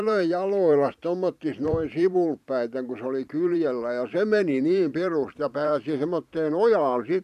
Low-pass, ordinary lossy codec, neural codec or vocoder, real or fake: 14.4 kHz; none; vocoder, 44.1 kHz, 128 mel bands every 512 samples, BigVGAN v2; fake